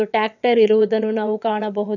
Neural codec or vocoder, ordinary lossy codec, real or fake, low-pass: vocoder, 22.05 kHz, 80 mel bands, WaveNeXt; none; fake; 7.2 kHz